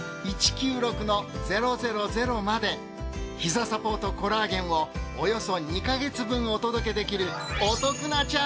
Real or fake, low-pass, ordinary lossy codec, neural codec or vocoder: real; none; none; none